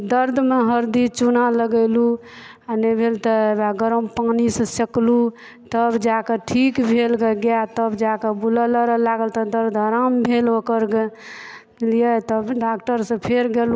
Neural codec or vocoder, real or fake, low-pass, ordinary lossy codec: none; real; none; none